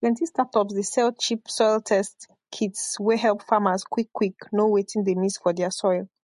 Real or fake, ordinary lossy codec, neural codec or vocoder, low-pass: real; MP3, 48 kbps; none; 14.4 kHz